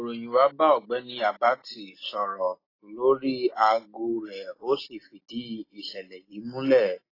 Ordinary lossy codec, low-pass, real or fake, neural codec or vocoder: AAC, 24 kbps; 5.4 kHz; real; none